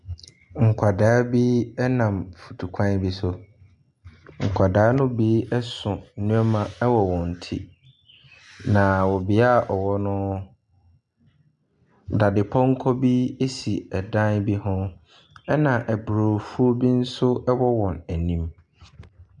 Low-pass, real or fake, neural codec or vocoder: 10.8 kHz; real; none